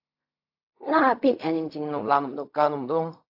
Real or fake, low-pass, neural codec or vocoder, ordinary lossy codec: fake; 5.4 kHz; codec, 16 kHz in and 24 kHz out, 0.4 kbps, LongCat-Audio-Codec, fine tuned four codebook decoder; AAC, 48 kbps